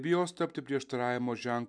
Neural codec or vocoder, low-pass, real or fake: none; 9.9 kHz; real